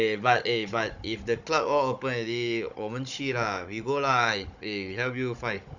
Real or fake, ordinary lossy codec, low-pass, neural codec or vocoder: fake; none; 7.2 kHz; codec, 16 kHz, 16 kbps, FunCodec, trained on Chinese and English, 50 frames a second